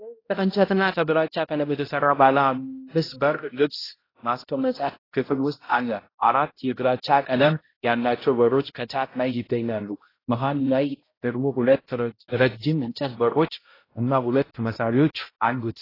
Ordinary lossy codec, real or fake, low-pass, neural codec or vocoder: AAC, 24 kbps; fake; 5.4 kHz; codec, 16 kHz, 0.5 kbps, X-Codec, HuBERT features, trained on balanced general audio